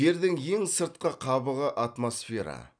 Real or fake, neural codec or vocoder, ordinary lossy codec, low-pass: real; none; none; 9.9 kHz